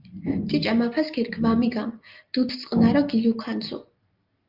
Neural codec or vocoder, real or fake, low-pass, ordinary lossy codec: none; real; 5.4 kHz; Opus, 24 kbps